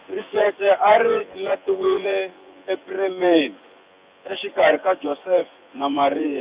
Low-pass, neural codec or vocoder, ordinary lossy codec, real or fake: 3.6 kHz; vocoder, 24 kHz, 100 mel bands, Vocos; Opus, 64 kbps; fake